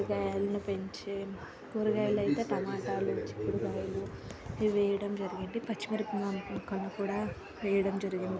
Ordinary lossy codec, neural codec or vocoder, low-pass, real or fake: none; none; none; real